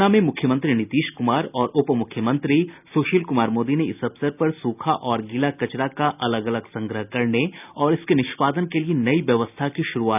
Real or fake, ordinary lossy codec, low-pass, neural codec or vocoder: real; none; 3.6 kHz; none